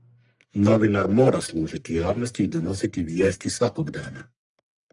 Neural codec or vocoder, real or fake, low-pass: codec, 44.1 kHz, 1.7 kbps, Pupu-Codec; fake; 10.8 kHz